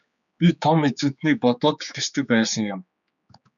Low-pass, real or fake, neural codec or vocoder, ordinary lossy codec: 7.2 kHz; fake; codec, 16 kHz, 4 kbps, X-Codec, HuBERT features, trained on general audio; MP3, 96 kbps